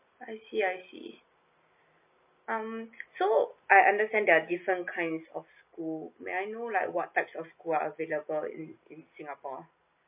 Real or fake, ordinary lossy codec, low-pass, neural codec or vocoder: real; MP3, 32 kbps; 3.6 kHz; none